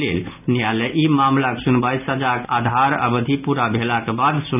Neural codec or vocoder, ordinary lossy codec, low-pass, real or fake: none; none; 3.6 kHz; real